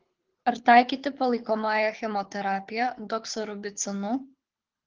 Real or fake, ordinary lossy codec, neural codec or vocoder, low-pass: fake; Opus, 16 kbps; codec, 24 kHz, 6 kbps, HILCodec; 7.2 kHz